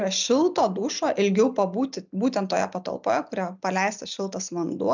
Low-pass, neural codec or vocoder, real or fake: 7.2 kHz; none; real